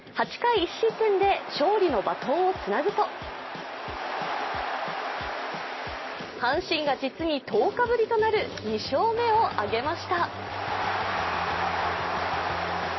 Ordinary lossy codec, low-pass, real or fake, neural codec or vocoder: MP3, 24 kbps; 7.2 kHz; real; none